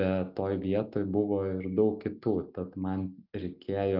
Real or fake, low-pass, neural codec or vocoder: real; 5.4 kHz; none